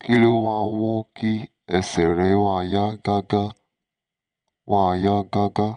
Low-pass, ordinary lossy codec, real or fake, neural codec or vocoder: 9.9 kHz; none; fake; vocoder, 22.05 kHz, 80 mel bands, WaveNeXt